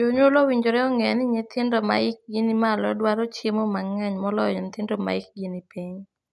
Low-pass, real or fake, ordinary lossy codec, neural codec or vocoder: none; real; none; none